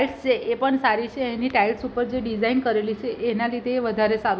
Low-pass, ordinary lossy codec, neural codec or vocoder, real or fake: none; none; none; real